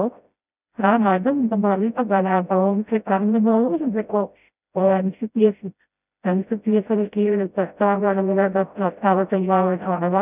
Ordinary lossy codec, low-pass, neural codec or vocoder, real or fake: none; 3.6 kHz; codec, 16 kHz, 0.5 kbps, FreqCodec, smaller model; fake